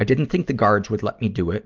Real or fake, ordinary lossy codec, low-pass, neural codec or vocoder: real; Opus, 24 kbps; 7.2 kHz; none